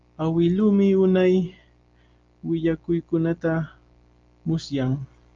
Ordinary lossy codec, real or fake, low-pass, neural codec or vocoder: Opus, 32 kbps; real; 7.2 kHz; none